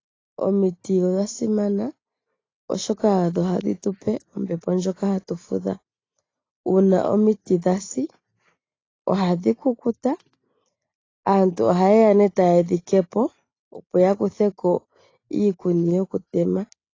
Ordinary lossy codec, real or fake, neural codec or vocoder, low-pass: AAC, 32 kbps; real; none; 7.2 kHz